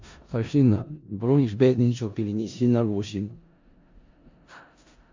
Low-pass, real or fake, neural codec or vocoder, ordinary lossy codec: 7.2 kHz; fake; codec, 16 kHz in and 24 kHz out, 0.4 kbps, LongCat-Audio-Codec, four codebook decoder; MP3, 48 kbps